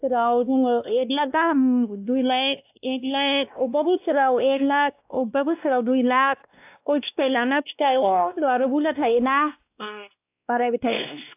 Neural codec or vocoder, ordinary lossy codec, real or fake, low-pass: codec, 16 kHz, 1 kbps, X-Codec, WavLM features, trained on Multilingual LibriSpeech; AAC, 32 kbps; fake; 3.6 kHz